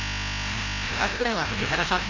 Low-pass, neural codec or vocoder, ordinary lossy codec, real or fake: 7.2 kHz; codec, 16 kHz, 0.5 kbps, FreqCodec, larger model; AAC, 32 kbps; fake